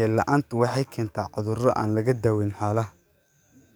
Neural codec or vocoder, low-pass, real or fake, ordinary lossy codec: codec, 44.1 kHz, 7.8 kbps, DAC; none; fake; none